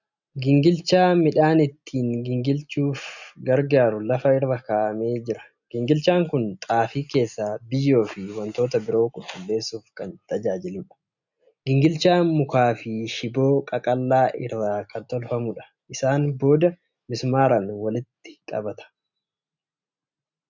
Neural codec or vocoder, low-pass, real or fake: none; 7.2 kHz; real